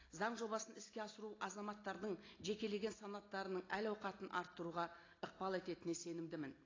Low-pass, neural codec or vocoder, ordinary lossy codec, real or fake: 7.2 kHz; none; AAC, 32 kbps; real